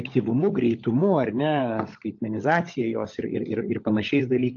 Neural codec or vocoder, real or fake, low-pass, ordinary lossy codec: codec, 16 kHz, 16 kbps, FunCodec, trained on LibriTTS, 50 frames a second; fake; 7.2 kHz; AAC, 48 kbps